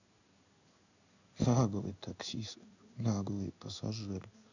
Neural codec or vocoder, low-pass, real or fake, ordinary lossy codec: codec, 16 kHz in and 24 kHz out, 1 kbps, XY-Tokenizer; 7.2 kHz; fake; none